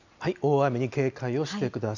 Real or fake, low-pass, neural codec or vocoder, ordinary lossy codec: real; 7.2 kHz; none; none